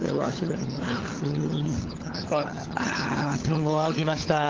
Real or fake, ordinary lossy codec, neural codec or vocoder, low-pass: fake; Opus, 16 kbps; codec, 16 kHz, 4 kbps, FunCodec, trained on LibriTTS, 50 frames a second; 7.2 kHz